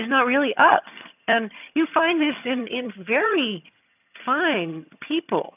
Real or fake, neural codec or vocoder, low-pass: fake; vocoder, 22.05 kHz, 80 mel bands, HiFi-GAN; 3.6 kHz